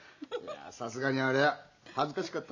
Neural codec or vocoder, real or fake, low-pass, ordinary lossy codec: none; real; 7.2 kHz; none